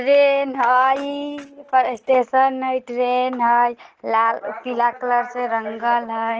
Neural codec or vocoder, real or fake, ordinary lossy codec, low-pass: none; real; Opus, 16 kbps; 7.2 kHz